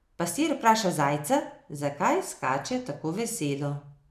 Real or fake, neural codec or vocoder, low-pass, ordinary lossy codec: real; none; 14.4 kHz; none